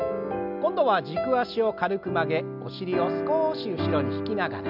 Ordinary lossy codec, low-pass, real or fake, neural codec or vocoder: none; 5.4 kHz; real; none